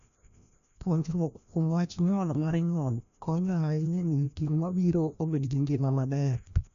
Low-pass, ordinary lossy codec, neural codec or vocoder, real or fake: 7.2 kHz; none; codec, 16 kHz, 1 kbps, FreqCodec, larger model; fake